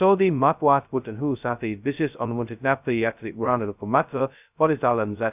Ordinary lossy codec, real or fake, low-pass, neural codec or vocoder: none; fake; 3.6 kHz; codec, 16 kHz, 0.2 kbps, FocalCodec